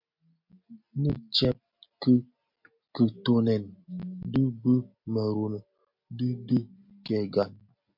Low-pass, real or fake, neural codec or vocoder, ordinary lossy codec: 5.4 kHz; real; none; MP3, 48 kbps